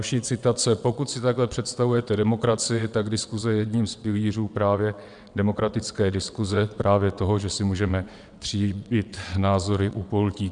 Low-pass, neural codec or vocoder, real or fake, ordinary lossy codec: 9.9 kHz; vocoder, 22.05 kHz, 80 mel bands, WaveNeXt; fake; MP3, 96 kbps